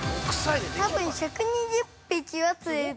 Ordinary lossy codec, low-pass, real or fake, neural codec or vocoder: none; none; real; none